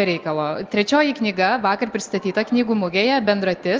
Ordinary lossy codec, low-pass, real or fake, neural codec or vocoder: Opus, 24 kbps; 7.2 kHz; real; none